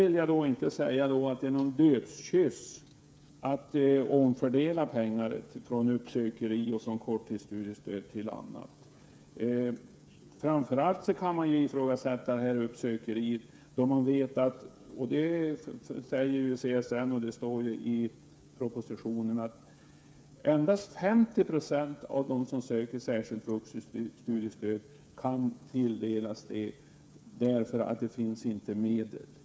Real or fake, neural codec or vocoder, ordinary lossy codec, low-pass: fake; codec, 16 kHz, 8 kbps, FreqCodec, smaller model; none; none